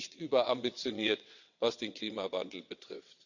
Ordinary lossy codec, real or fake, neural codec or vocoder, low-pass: none; fake; vocoder, 22.05 kHz, 80 mel bands, WaveNeXt; 7.2 kHz